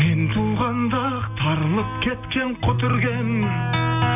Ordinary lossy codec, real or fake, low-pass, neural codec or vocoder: none; real; 3.6 kHz; none